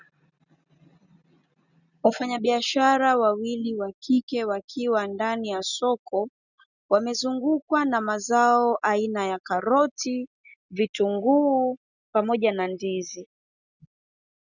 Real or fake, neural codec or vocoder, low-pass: real; none; 7.2 kHz